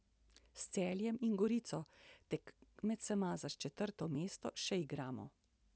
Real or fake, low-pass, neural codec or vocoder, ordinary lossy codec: real; none; none; none